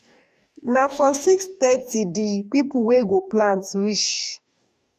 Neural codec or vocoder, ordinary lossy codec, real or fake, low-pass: codec, 44.1 kHz, 2.6 kbps, DAC; none; fake; 14.4 kHz